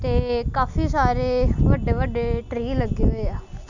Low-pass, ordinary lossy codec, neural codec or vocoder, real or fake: 7.2 kHz; none; none; real